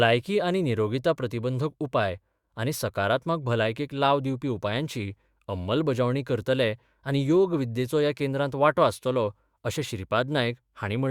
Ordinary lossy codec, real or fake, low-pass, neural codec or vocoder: Opus, 64 kbps; fake; 19.8 kHz; autoencoder, 48 kHz, 128 numbers a frame, DAC-VAE, trained on Japanese speech